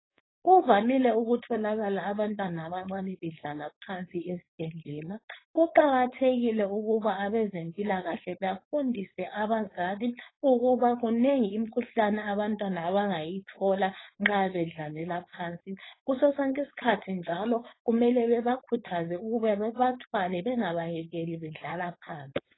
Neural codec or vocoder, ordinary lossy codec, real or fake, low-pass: codec, 16 kHz, 4.8 kbps, FACodec; AAC, 16 kbps; fake; 7.2 kHz